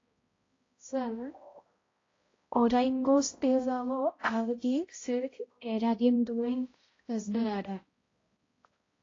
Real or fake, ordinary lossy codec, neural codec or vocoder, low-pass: fake; AAC, 32 kbps; codec, 16 kHz, 0.5 kbps, X-Codec, HuBERT features, trained on balanced general audio; 7.2 kHz